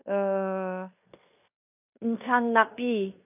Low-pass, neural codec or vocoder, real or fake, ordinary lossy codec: 3.6 kHz; codec, 16 kHz in and 24 kHz out, 0.9 kbps, LongCat-Audio-Codec, fine tuned four codebook decoder; fake; none